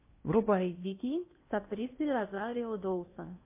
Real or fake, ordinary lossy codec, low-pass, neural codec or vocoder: fake; MP3, 24 kbps; 3.6 kHz; codec, 16 kHz in and 24 kHz out, 0.8 kbps, FocalCodec, streaming, 65536 codes